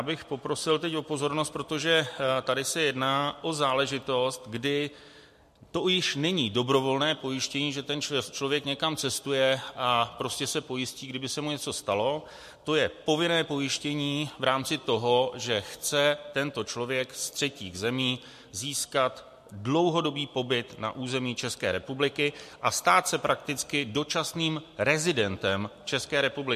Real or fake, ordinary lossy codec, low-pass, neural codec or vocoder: real; MP3, 64 kbps; 14.4 kHz; none